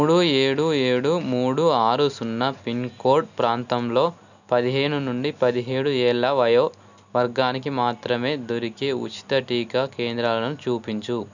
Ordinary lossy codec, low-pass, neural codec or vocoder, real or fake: none; 7.2 kHz; none; real